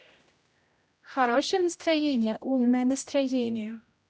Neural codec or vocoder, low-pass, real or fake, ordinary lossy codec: codec, 16 kHz, 0.5 kbps, X-Codec, HuBERT features, trained on general audio; none; fake; none